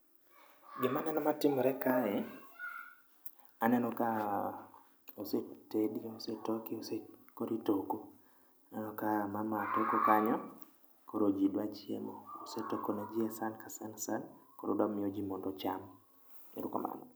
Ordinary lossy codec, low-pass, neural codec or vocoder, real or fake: none; none; none; real